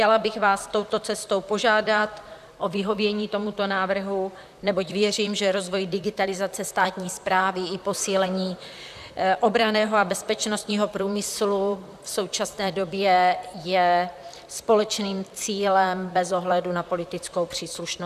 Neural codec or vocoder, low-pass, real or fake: vocoder, 44.1 kHz, 128 mel bands, Pupu-Vocoder; 14.4 kHz; fake